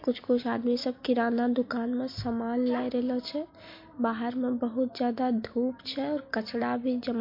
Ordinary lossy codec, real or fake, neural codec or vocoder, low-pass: MP3, 32 kbps; real; none; 5.4 kHz